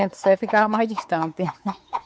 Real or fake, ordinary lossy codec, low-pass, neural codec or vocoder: fake; none; none; codec, 16 kHz, 4 kbps, X-Codec, WavLM features, trained on Multilingual LibriSpeech